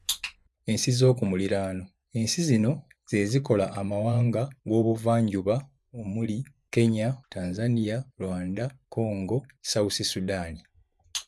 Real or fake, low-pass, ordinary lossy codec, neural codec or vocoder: fake; none; none; vocoder, 24 kHz, 100 mel bands, Vocos